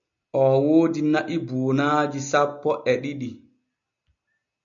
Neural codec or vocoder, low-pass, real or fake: none; 7.2 kHz; real